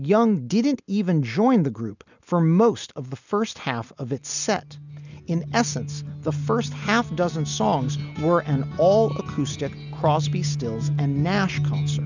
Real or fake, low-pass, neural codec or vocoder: real; 7.2 kHz; none